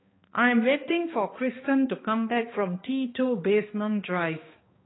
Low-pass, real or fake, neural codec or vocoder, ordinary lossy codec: 7.2 kHz; fake; codec, 16 kHz, 2 kbps, X-Codec, HuBERT features, trained on balanced general audio; AAC, 16 kbps